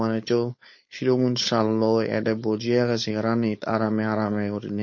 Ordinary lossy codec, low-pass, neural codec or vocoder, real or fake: MP3, 32 kbps; 7.2 kHz; codec, 16 kHz, 4.8 kbps, FACodec; fake